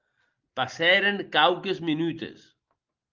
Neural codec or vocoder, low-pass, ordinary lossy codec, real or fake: none; 7.2 kHz; Opus, 32 kbps; real